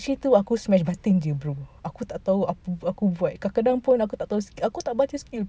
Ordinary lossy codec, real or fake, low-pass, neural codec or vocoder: none; real; none; none